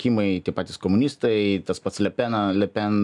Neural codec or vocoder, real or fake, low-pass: none; real; 10.8 kHz